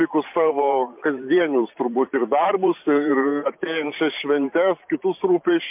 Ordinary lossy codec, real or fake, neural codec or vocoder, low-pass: MP3, 32 kbps; fake; vocoder, 44.1 kHz, 128 mel bands every 512 samples, BigVGAN v2; 3.6 kHz